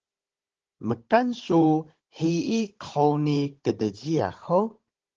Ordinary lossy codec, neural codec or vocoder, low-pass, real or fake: Opus, 16 kbps; codec, 16 kHz, 16 kbps, FunCodec, trained on Chinese and English, 50 frames a second; 7.2 kHz; fake